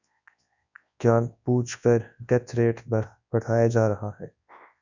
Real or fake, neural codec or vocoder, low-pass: fake; codec, 24 kHz, 0.9 kbps, WavTokenizer, large speech release; 7.2 kHz